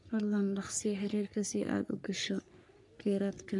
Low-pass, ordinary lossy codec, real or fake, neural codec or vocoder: 10.8 kHz; none; fake; codec, 44.1 kHz, 3.4 kbps, Pupu-Codec